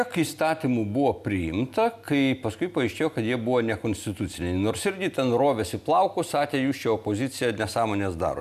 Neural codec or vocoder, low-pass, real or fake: none; 14.4 kHz; real